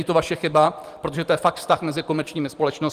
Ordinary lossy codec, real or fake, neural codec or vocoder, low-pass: Opus, 24 kbps; fake; vocoder, 44.1 kHz, 128 mel bands every 512 samples, BigVGAN v2; 14.4 kHz